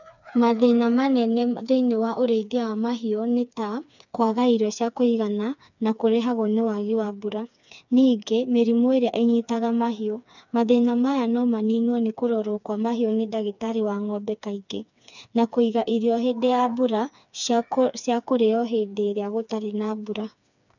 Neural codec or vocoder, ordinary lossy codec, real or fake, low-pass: codec, 16 kHz, 4 kbps, FreqCodec, smaller model; none; fake; 7.2 kHz